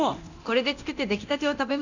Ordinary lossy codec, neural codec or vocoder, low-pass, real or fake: none; codec, 24 kHz, 0.9 kbps, DualCodec; 7.2 kHz; fake